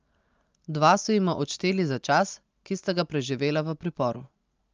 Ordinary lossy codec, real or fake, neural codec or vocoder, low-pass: Opus, 24 kbps; real; none; 7.2 kHz